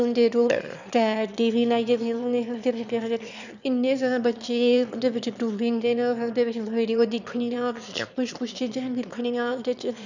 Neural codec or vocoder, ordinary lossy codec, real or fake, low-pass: autoencoder, 22.05 kHz, a latent of 192 numbers a frame, VITS, trained on one speaker; none; fake; 7.2 kHz